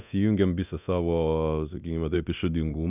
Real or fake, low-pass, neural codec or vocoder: fake; 3.6 kHz; codec, 24 kHz, 0.9 kbps, DualCodec